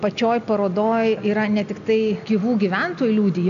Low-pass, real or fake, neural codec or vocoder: 7.2 kHz; real; none